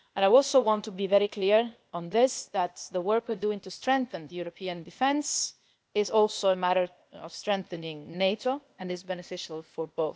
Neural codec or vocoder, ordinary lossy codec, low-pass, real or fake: codec, 16 kHz, 0.8 kbps, ZipCodec; none; none; fake